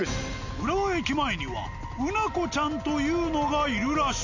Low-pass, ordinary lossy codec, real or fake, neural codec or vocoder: 7.2 kHz; none; real; none